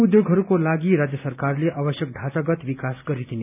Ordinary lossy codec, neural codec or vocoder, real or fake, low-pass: none; none; real; 3.6 kHz